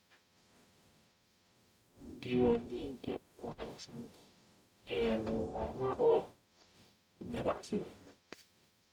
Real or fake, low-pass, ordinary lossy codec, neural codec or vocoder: fake; 19.8 kHz; none; codec, 44.1 kHz, 0.9 kbps, DAC